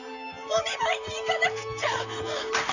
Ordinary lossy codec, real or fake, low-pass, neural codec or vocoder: none; fake; 7.2 kHz; vocoder, 44.1 kHz, 128 mel bands, Pupu-Vocoder